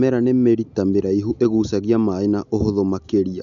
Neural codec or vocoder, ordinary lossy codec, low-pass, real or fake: none; none; 7.2 kHz; real